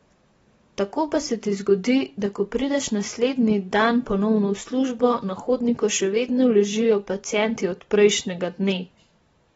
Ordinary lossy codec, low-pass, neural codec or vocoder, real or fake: AAC, 24 kbps; 19.8 kHz; vocoder, 44.1 kHz, 128 mel bands every 512 samples, BigVGAN v2; fake